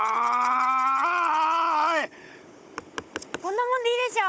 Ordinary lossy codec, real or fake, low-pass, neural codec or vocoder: none; fake; none; codec, 16 kHz, 16 kbps, FunCodec, trained on Chinese and English, 50 frames a second